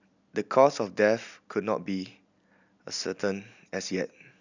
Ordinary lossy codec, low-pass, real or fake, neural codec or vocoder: none; 7.2 kHz; real; none